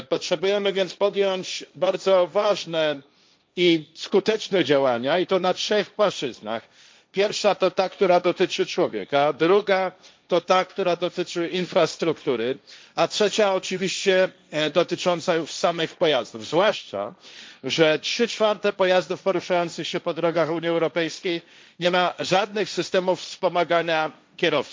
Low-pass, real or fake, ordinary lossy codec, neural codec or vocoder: none; fake; none; codec, 16 kHz, 1.1 kbps, Voila-Tokenizer